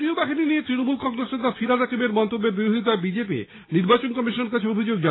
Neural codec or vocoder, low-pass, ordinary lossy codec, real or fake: none; 7.2 kHz; AAC, 16 kbps; real